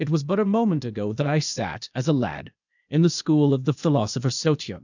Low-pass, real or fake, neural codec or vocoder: 7.2 kHz; fake; codec, 16 kHz, 0.8 kbps, ZipCodec